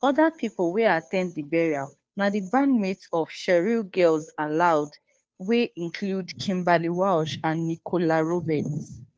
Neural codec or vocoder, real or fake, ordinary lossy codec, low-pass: codec, 16 kHz, 2 kbps, FunCodec, trained on Chinese and English, 25 frames a second; fake; none; none